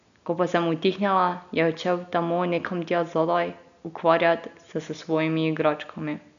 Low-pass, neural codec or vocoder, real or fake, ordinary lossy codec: 7.2 kHz; none; real; none